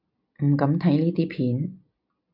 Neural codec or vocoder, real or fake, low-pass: none; real; 5.4 kHz